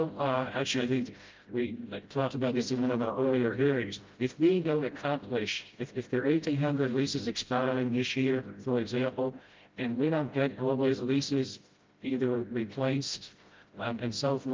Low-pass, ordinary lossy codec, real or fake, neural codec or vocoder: 7.2 kHz; Opus, 32 kbps; fake; codec, 16 kHz, 0.5 kbps, FreqCodec, smaller model